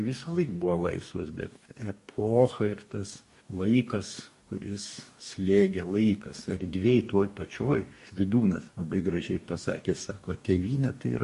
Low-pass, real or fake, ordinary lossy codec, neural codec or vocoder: 14.4 kHz; fake; MP3, 48 kbps; codec, 44.1 kHz, 2.6 kbps, DAC